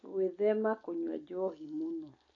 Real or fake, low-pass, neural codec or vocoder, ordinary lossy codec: real; 7.2 kHz; none; AAC, 32 kbps